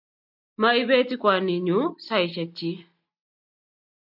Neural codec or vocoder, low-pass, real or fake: none; 5.4 kHz; real